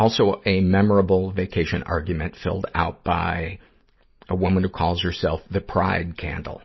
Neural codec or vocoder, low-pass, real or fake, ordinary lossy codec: none; 7.2 kHz; real; MP3, 24 kbps